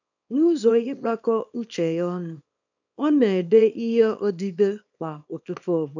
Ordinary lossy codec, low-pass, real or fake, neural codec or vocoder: none; 7.2 kHz; fake; codec, 24 kHz, 0.9 kbps, WavTokenizer, small release